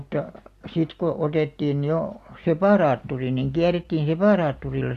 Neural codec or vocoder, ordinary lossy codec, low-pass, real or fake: none; AAC, 64 kbps; 14.4 kHz; real